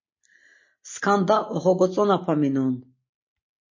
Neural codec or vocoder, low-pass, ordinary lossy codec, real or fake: none; 7.2 kHz; MP3, 32 kbps; real